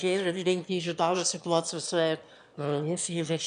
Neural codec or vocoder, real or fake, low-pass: autoencoder, 22.05 kHz, a latent of 192 numbers a frame, VITS, trained on one speaker; fake; 9.9 kHz